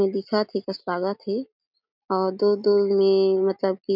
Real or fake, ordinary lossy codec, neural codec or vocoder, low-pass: real; none; none; 5.4 kHz